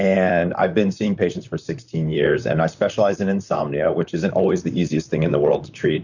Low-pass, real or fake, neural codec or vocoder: 7.2 kHz; fake; vocoder, 44.1 kHz, 128 mel bands, Pupu-Vocoder